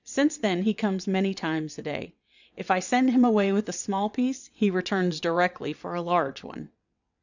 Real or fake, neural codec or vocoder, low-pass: fake; vocoder, 22.05 kHz, 80 mel bands, WaveNeXt; 7.2 kHz